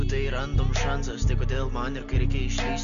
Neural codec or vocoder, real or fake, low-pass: none; real; 7.2 kHz